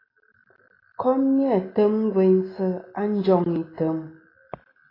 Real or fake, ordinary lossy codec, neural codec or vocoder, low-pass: real; AAC, 24 kbps; none; 5.4 kHz